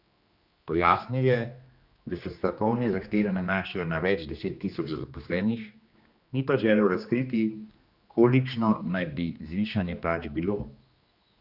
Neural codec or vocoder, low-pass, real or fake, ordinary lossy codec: codec, 16 kHz, 2 kbps, X-Codec, HuBERT features, trained on general audio; 5.4 kHz; fake; Opus, 64 kbps